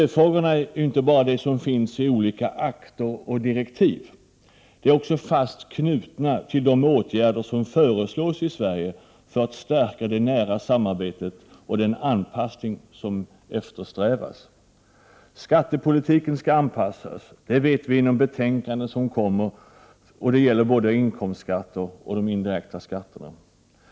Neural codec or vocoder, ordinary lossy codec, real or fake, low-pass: none; none; real; none